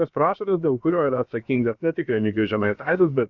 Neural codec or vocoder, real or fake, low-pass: codec, 16 kHz, about 1 kbps, DyCAST, with the encoder's durations; fake; 7.2 kHz